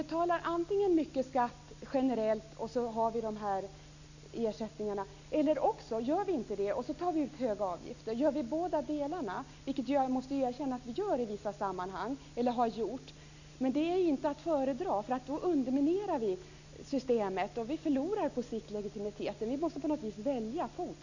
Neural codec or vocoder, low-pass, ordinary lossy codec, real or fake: none; 7.2 kHz; none; real